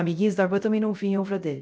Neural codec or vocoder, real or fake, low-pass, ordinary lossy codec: codec, 16 kHz, 0.3 kbps, FocalCodec; fake; none; none